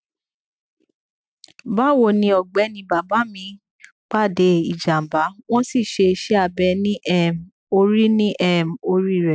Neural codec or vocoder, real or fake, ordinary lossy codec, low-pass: none; real; none; none